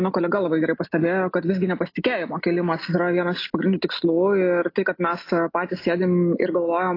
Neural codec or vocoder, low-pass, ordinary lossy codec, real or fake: none; 5.4 kHz; AAC, 32 kbps; real